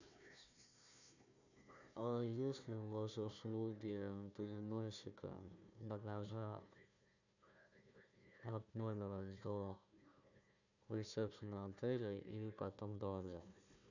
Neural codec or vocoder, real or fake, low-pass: codec, 16 kHz, 1 kbps, FunCodec, trained on Chinese and English, 50 frames a second; fake; 7.2 kHz